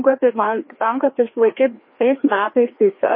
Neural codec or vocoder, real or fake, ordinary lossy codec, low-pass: codec, 16 kHz, 2 kbps, FreqCodec, larger model; fake; MP3, 24 kbps; 5.4 kHz